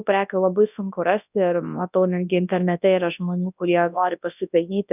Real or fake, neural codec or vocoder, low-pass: fake; codec, 24 kHz, 0.9 kbps, WavTokenizer, large speech release; 3.6 kHz